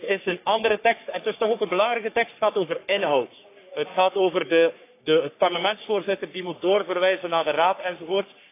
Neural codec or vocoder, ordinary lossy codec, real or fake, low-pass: codec, 44.1 kHz, 3.4 kbps, Pupu-Codec; AAC, 24 kbps; fake; 3.6 kHz